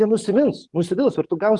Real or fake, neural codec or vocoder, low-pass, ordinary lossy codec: fake; codec, 44.1 kHz, 7.8 kbps, DAC; 10.8 kHz; Opus, 24 kbps